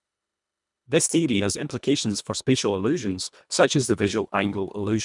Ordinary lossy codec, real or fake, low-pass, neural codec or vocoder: none; fake; 10.8 kHz; codec, 24 kHz, 1.5 kbps, HILCodec